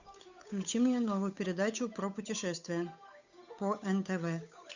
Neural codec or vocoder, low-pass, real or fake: codec, 16 kHz, 8 kbps, FunCodec, trained on Chinese and English, 25 frames a second; 7.2 kHz; fake